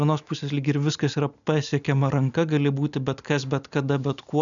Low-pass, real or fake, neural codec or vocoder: 7.2 kHz; real; none